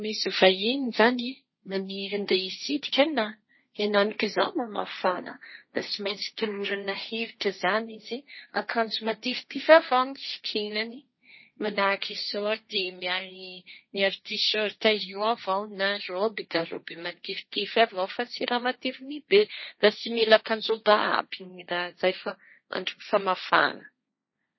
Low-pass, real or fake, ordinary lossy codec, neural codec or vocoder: 7.2 kHz; fake; MP3, 24 kbps; codec, 16 kHz, 1.1 kbps, Voila-Tokenizer